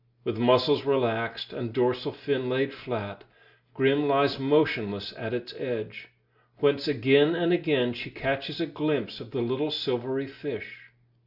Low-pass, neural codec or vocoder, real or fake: 5.4 kHz; none; real